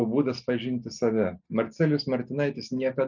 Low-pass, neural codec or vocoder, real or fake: 7.2 kHz; none; real